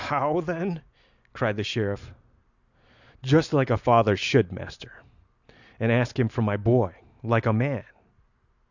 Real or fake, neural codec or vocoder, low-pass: real; none; 7.2 kHz